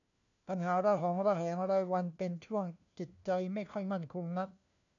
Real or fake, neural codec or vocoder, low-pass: fake; codec, 16 kHz, 1 kbps, FunCodec, trained on LibriTTS, 50 frames a second; 7.2 kHz